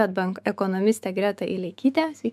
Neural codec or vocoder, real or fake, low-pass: autoencoder, 48 kHz, 128 numbers a frame, DAC-VAE, trained on Japanese speech; fake; 14.4 kHz